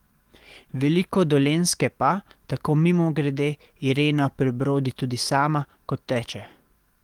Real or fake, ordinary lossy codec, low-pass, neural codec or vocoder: fake; Opus, 24 kbps; 19.8 kHz; codec, 44.1 kHz, 7.8 kbps, DAC